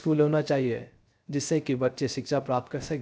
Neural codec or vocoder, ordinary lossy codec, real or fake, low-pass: codec, 16 kHz, 0.3 kbps, FocalCodec; none; fake; none